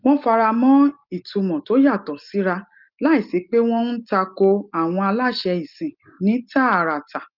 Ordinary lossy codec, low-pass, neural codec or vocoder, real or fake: Opus, 24 kbps; 5.4 kHz; none; real